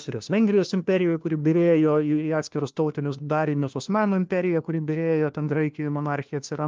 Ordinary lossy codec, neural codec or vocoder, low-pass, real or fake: Opus, 24 kbps; codec, 16 kHz, 1 kbps, FunCodec, trained on LibriTTS, 50 frames a second; 7.2 kHz; fake